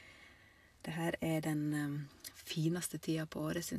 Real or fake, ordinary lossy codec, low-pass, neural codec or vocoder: real; AAC, 64 kbps; 14.4 kHz; none